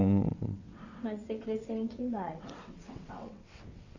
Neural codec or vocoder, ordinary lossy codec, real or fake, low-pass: vocoder, 22.05 kHz, 80 mel bands, WaveNeXt; none; fake; 7.2 kHz